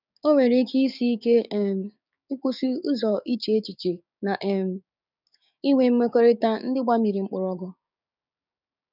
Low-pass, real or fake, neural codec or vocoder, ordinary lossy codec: 5.4 kHz; fake; codec, 16 kHz, 6 kbps, DAC; none